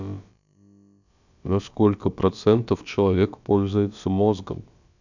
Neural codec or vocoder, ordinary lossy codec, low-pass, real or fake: codec, 16 kHz, about 1 kbps, DyCAST, with the encoder's durations; none; 7.2 kHz; fake